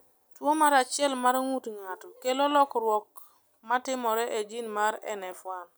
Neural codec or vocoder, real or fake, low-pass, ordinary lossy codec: none; real; none; none